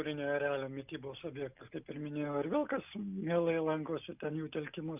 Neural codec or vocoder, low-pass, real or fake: none; 3.6 kHz; real